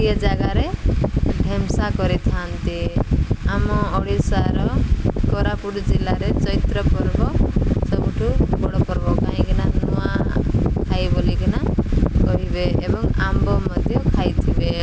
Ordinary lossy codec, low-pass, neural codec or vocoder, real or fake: none; none; none; real